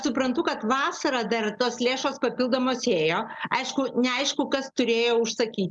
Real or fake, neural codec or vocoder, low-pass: real; none; 10.8 kHz